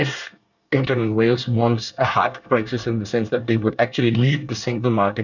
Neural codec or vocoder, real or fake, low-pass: codec, 24 kHz, 1 kbps, SNAC; fake; 7.2 kHz